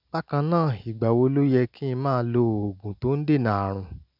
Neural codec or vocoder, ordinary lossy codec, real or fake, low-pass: none; none; real; 5.4 kHz